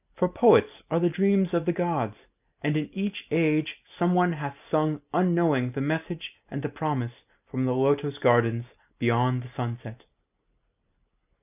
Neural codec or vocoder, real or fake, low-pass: none; real; 3.6 kHz